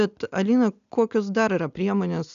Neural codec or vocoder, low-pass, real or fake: none; 7.2 kHz; real